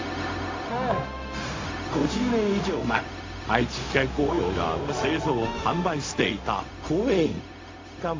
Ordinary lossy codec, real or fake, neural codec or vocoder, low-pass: AAC, 32 kbps; fake; codec, 16 kHz, 0.4 kbps, LongCat-Audio-Codec; 7.2 kHz